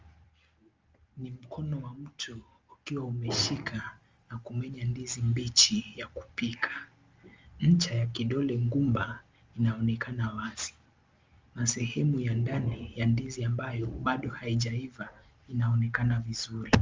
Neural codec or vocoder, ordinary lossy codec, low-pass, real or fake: none; Opus, 32 kbps; 7.2 kHz; real